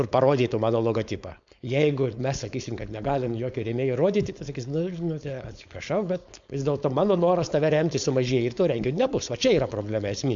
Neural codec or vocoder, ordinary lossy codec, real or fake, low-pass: codec, 16 kHz, 4.8 kbps, FACodec; MP3, 64 kbps; fake; 7.2 kHz